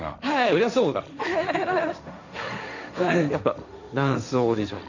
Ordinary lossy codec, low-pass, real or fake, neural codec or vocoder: none; 7.2 kHz; fake; codec, 16 kHz, 1.1 kbps, Voila-Tokenizer